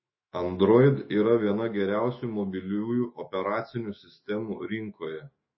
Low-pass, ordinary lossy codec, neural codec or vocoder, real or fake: 7.2 kHz; MP3, 24 kbps; autoencoder, 48 kHz, 128 numbers a frame, DAC-VAE, trained on Japanese speech; fake